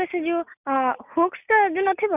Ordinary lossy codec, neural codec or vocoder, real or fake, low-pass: none; none; real; 3.6 kHz